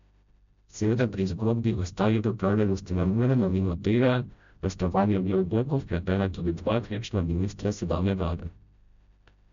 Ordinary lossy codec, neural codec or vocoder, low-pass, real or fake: MP3, 64 kbps; codec, 16 kHz, 0.5 kbps, FreqCodec, smaller model; 7.2 kHz; fake